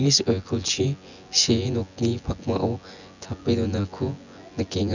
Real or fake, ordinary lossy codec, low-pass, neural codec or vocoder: fake; none; 7.2 kHz; vocoder, 24 kHz, 100 mel bands, Vocos